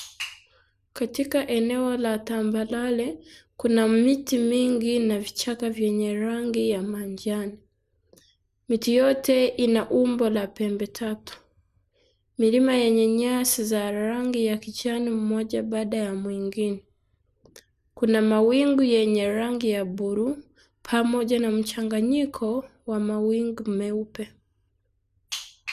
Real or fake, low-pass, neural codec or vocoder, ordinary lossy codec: real; 14.4 kHz; none; none